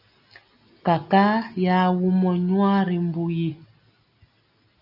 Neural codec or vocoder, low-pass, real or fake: none; 5.4 kHz; real